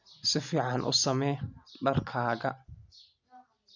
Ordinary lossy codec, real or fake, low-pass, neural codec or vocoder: none; real; 7.2 kHz; none